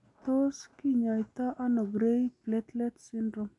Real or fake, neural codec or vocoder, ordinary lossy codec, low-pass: real; none; MP3, 96 kbps; 10.8 kHz